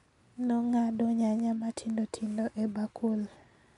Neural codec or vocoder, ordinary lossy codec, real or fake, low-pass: none; none; real; 10.8 kHz